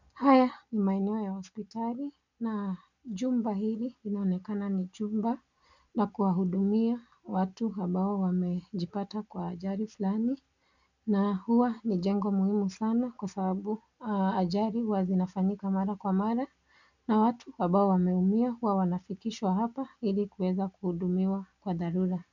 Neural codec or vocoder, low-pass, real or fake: none; 7.2 kHz; real